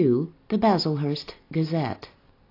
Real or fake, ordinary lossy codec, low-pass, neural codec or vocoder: real; AAC, 32 kbps; 5.4 kHz; none